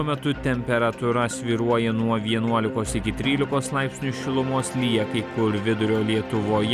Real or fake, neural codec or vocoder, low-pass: real; none; 14.4 kHz